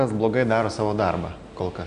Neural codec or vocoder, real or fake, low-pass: none; real; 9.9 kHz